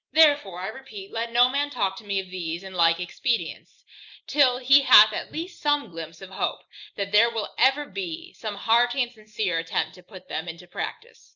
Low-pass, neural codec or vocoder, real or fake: 7.2 kHz; none; real